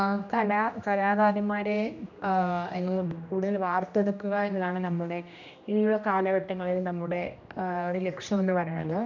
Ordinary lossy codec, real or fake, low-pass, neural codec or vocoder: none; fake; 7.2 kHz; codec, 16 kHz, 1 kbps, X-Codec, HuBERT features, trained on general audio